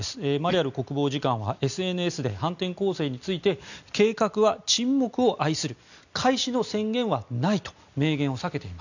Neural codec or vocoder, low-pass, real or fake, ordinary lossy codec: none; 7.2 kHz; real; none